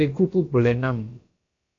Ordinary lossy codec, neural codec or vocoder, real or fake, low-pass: AAC, 48 kbps; codec, 16 kHz, about 1 kbps, DyCAST, with the encoder's durations; fake; 7.2 kHz